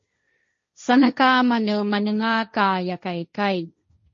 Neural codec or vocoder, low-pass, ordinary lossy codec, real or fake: codec, 16 kHz, 1.1 kbps, Voila-Tokenizer; 7.2 kHz; MP3, 32 kbps; fake